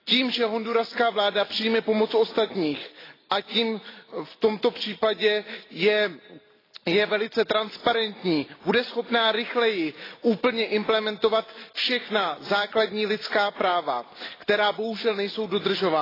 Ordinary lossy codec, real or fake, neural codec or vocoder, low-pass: AAC, 24 kbps; real; none; 5.4 kHz